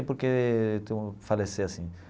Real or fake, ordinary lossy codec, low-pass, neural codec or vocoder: real; none; none; none